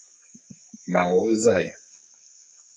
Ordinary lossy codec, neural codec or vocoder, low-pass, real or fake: MP3, 48 kbps; codec, 44.1 kHz, 2.6 kbps, SNAC; 9.9 kHz; fake